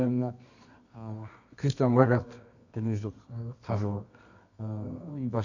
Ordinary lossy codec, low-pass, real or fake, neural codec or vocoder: none; 7.2 kHz; fake; codec, 24 kHz, 0.9 kbps, WavTokenizer, medium music audio release